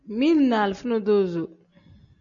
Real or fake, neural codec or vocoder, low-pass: real; none; 7.2 kHz